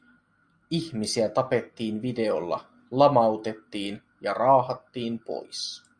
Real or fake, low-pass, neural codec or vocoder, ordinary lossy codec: real; 9.9 kHz; none; Opus, 64 kbps